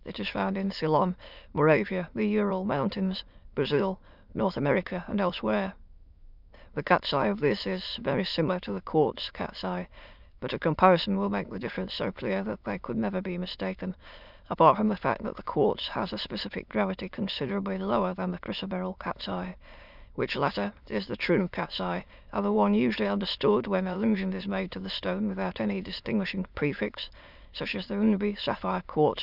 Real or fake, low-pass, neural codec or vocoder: fake; 5.4 kHz; autoencoder, 22.05 kHz, a latent of 192 numbers a frame, VITS, trained on many speakers